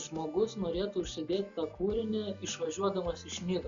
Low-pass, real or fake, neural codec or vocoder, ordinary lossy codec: 7.2 kHz; real; none; Opus, 64 kbps